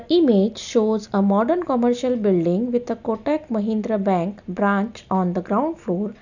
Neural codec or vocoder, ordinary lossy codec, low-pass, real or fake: none; none; 7.2 kHz; real